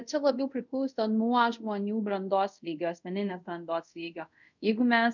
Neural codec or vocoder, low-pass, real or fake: codec, 24 kHz, 0.5 kbps, DualCodec; 7.2 kHz; fake